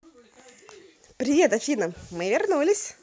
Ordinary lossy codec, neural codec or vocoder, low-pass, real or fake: none; none; none; real